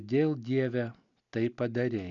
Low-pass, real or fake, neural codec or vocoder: 7.2 kHz; real; none